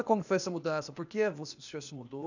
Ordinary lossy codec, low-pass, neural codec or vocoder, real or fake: none; 7.2 kHz; codec, 16 kHz, 0.8 kbps, ZipCodec; fake